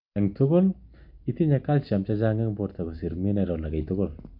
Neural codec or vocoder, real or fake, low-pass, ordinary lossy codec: none; real; 5.4 kHz; MP3, 48 kbps